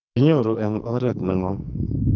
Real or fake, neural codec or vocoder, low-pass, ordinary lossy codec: fake; codec, 44.1 kHz, 2.6 kbps, SNAC; 7.2 kHz; none